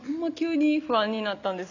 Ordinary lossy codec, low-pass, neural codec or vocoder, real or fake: none; 7.2 kHz; none; real